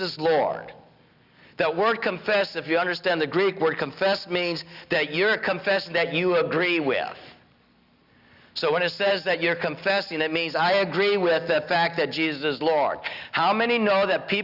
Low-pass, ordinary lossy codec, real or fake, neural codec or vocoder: 5.4 kHz; Opus, 64 kbps; real; none